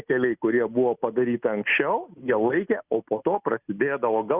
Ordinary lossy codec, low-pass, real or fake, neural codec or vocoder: Opus, 64 kbps; 3.6 kHz; real; none